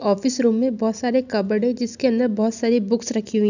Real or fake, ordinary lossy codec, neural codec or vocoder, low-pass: real; none; none; 7.2 kHz